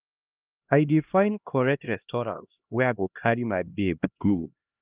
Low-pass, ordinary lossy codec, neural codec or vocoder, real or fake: 3.6 kHz; Opus, 32 kbps; codec, 16 kHz, 1 kbps, X-Codec, HuBERT features, trained on LibriSpeech; fake